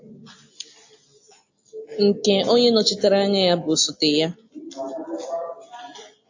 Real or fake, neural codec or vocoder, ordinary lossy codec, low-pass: real; none; AAC, 48 kbps; 7.2 kHz